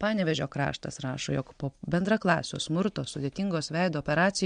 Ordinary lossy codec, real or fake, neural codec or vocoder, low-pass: MP3, 64 kbps; real; none; 9.9 kHz